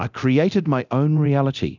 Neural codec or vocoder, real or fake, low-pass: codec, 24 kHz, 0.9 kbps, DualCodec; fake; 7.2 kHz